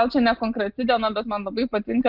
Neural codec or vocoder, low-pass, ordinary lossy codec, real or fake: none; 5.4 kHz; Opus, 32 kbps; real